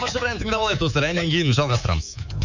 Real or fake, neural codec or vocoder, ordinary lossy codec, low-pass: fake; codec, 24 kHz, 3.1 kbps, DualCodec; none; 7.2 kHz